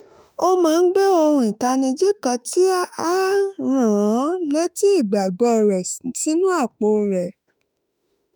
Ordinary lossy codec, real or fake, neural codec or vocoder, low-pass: none; fake; autoencoder, 48 kHz, 32 numbers a frame, DAC-VAE, trained on Japanese speech; none